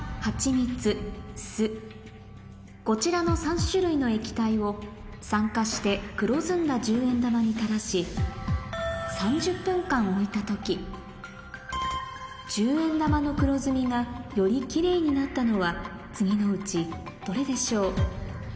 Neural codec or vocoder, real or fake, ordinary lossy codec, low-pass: none; real; none; none